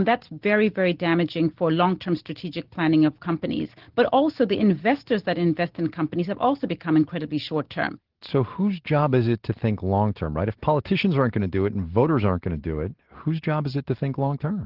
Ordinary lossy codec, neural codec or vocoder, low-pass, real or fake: Opus, 16 kbps; none; 5.4 kHz; real